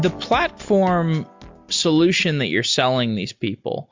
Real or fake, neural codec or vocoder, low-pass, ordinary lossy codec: real; none; 7.2 kHz; MP3, 64 kbps